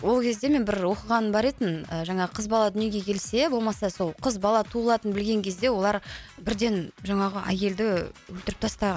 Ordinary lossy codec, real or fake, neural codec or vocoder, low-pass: none; real; none; none